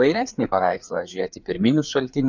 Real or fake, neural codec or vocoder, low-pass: fake; codec, 16 kHz, 4 kbps, FreqCodec, larger model; 7.2 kHz